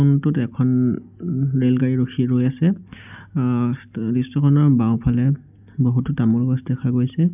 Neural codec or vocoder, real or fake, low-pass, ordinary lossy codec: none; real; 3.6 kHz; none